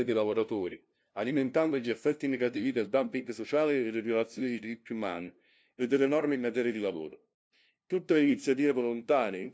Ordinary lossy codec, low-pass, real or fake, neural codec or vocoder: none; none; fake; codec, 16 kHz, 0.5 kbps, FunCodec, trained on LibriTTS, 25 frames a second